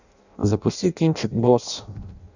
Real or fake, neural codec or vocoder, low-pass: fake; codec, 16 kHz in and 24 kHz out, 0.6 kbps, FireRedTTS-2 codec; 7.2 kHz